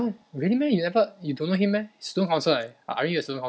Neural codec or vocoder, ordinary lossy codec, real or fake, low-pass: none; none; real; none